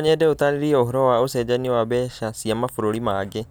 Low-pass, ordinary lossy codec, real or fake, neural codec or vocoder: none; none; real; none